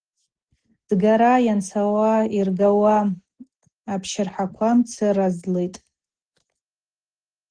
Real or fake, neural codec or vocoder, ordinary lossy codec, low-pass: real; none; Opus, 16 kbps; 9.9 kHz